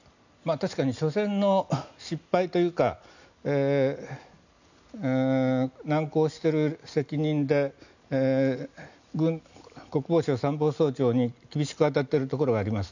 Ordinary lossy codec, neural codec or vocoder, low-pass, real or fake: none; none; 7.2 kHz; real